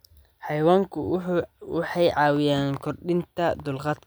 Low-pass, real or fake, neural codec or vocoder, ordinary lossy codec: none; real; none; none